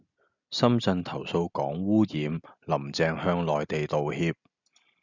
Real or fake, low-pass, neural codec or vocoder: real; 7.2 kHz; none